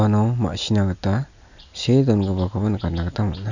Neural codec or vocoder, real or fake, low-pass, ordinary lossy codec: none; real; 7.2 kHz; none